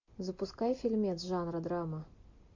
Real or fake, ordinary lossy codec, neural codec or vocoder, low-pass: real; MP3, 48 kbps; none; 7.2 kHz